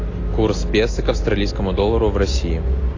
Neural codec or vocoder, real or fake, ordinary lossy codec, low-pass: none; real; AAC, 32 kbps; 7.2 kHz